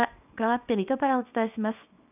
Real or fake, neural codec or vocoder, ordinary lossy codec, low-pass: fake; codec, 24 kHz, 0.9 kbps, WavTokenizer, small release; none; 3.6 kHz